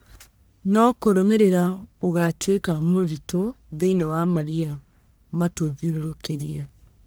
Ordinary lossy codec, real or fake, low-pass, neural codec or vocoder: none; fake; none; codec, 44.1 kHz, 1.7 kbps, Pupu-Codec